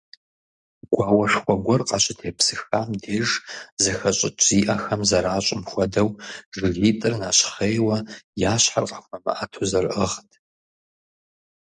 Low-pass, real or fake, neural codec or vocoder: 10.8 kHz; real; none